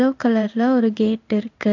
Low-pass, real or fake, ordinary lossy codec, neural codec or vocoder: 7.2 kHz; fake; none; codec, 16 kHz in and 24 kHz out, 1 kbps, XY-Tokenizer